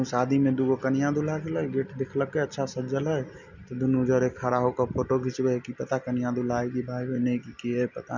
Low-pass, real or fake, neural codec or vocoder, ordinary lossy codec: 7.2 kHz; real; none; none